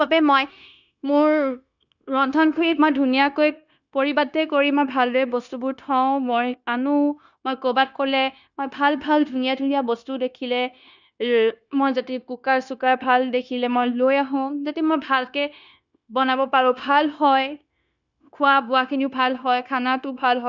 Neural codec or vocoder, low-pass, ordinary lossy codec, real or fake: codec, 16 kHz, 0.9 kbps, LongCat-Audio-Codec; 7.2 kHz; none; fake